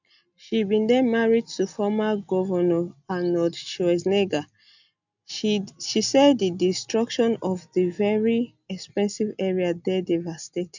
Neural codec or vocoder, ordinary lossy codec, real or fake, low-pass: none; none; real; 7.2 kHz